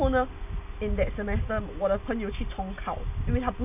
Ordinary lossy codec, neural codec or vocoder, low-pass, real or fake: none; none; 3.6 kHz; real